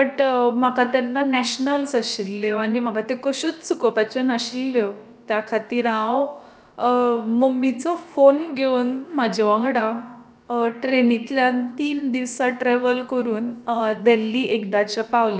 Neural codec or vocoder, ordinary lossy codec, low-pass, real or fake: codec, 16 kHz, about 1 kbps, DyCAST, with the encoder's durations; none; none; fake